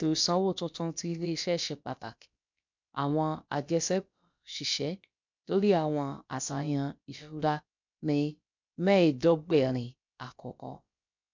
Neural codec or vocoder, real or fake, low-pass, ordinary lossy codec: codec, 16 kHz, about 1 kbps, DyCAST, with the encoder's durations; fake; 7.2 kHz; MP3, 64 kbps